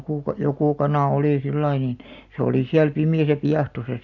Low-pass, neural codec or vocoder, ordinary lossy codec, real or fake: 7.2 kHz; none; none; real